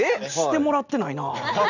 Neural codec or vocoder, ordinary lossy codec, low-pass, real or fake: none; none; 7.2 kHz; real